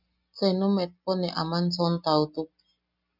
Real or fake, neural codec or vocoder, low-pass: real; none; 5.4 kHz